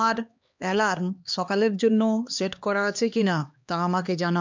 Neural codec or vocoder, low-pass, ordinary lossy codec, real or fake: codec, 16 kHz, 2 kbps, X-Codec, HuBERT features, trained on LibriSpeech; 7.2 kHz; MP3, 64 kbps; fake